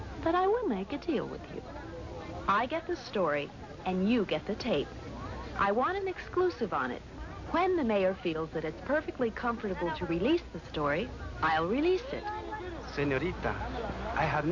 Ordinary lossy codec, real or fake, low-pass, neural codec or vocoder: AAC, 48 kbps; real; 7.2 kHz; none